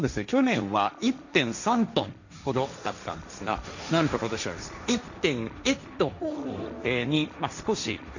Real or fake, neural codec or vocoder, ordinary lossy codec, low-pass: fake; codec, 16 kHz, 1.1 kbps, Voila-Tokenizer; none; 7.2 kHz